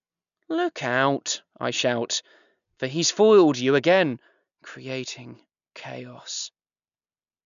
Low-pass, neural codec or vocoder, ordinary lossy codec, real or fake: 7.2 kHz; none; none; real